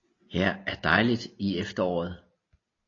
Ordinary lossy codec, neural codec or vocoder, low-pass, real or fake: AAC, 32 kbps; none; 7.2 kHz; real